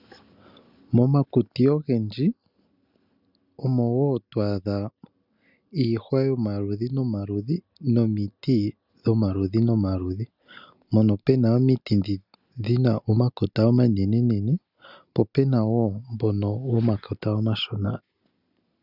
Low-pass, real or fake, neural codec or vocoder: 5.4 kHz; real; none